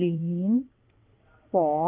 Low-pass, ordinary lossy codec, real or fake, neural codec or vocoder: 3.6 kHz; Opus, 24 kbps; fake; vocoder, 22.05 kHz, 80 mel bands, WaveNeXt